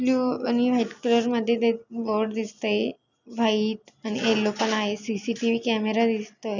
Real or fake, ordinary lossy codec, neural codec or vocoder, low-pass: real; none; none; 7.2 kHz